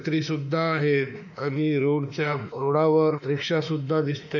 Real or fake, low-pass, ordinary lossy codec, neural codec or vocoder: fake; 7.2 kHz; none; autoencoder, 48 kHz, 32 numbers a frame, DAC-VAE, trained on Japanese speech